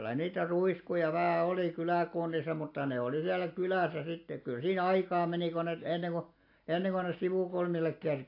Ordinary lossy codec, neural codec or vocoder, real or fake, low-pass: AAC, 32 kbps; none; real; 5.4 kHz